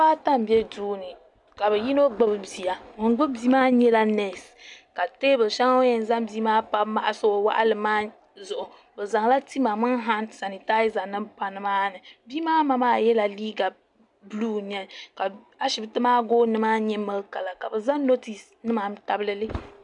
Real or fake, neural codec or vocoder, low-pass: real; none; 10.8 kHz